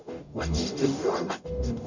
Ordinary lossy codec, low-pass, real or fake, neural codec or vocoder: none; 7.2 kHz; fake; codec, 44.1 kHz, 0.9 kbps, DAC